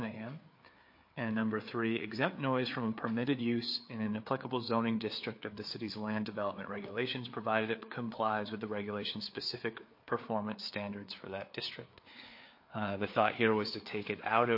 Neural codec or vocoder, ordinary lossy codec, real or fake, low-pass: codec, 16 kHz, 4 kbps, FreqCodec, larger model; MP3, 32 kbps; fake; 5.4 kHz